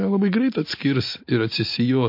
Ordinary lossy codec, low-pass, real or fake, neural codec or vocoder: MP3, 32 kbps; 5.4 kHz; real; none